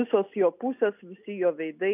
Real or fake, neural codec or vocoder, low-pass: real; none; 3.6 kHz